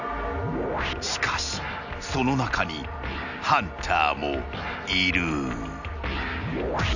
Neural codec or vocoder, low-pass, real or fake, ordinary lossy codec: none; 7.2 kHz; real; none